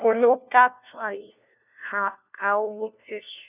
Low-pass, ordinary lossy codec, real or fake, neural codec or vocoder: 3.6 kHz; none; fake; codec, 16 kHz, 1 kbps, FunCodec, trained on LibriTTS, 50 frames a second